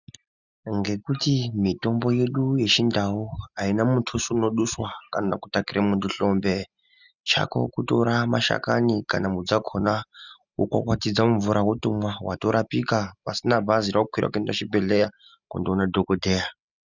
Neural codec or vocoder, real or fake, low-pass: none; real; 7.2 kHz